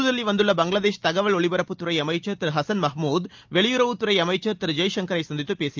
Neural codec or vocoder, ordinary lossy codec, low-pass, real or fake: none; Opus, 24 kbps; 7.2 kHz; real